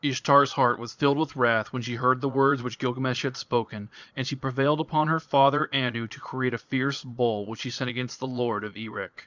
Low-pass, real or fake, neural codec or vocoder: 7.2 kHz; fake; vocoder, 22.05 kHz, 80 mel bands, Vocos